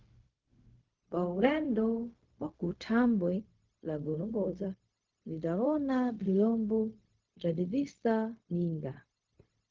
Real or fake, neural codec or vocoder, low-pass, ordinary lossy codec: fake; codec, 16 kHz, 0.4 kbps, LongCat-Audio-Codec; 7.2 kHz; Opus, 16 kbps